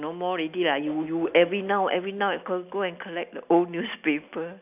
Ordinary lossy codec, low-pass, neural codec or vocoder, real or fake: none; 3.6 kHz; none; real